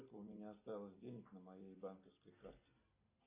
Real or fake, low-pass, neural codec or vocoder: real; 3.6 kHz; none